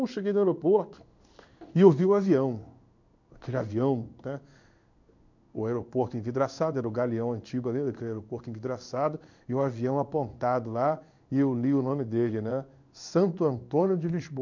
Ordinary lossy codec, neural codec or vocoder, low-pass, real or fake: none; codec, 16 kHz in and 24 kHz out, 1 kbps, XY-Tokenizer; 7.2 kHz; fake